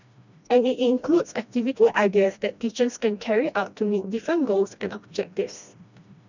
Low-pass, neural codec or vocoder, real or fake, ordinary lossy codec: 7.2 kHz; codec, 16 kHz, 1 kbps, FreqCodec, smaller model; fake; none